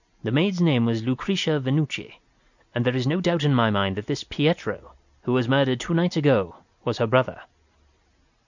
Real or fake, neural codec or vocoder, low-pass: real; none; 7.2 kHz